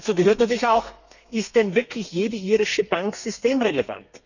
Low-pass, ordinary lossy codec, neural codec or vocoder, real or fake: 7.2 kHz; none; codec, 32 kHz, 1.9 kbps, SNAC; fake